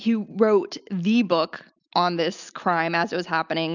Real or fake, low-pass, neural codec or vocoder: real; 7.2 kHz; none